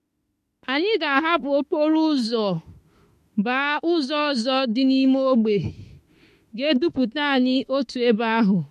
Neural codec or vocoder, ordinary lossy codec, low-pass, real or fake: autoencoder, 48 kHz, 32 numbers a frame, DAC-VAE, trained on Japanese speech; MP3, 64 kbps; 14.4 kHz; fake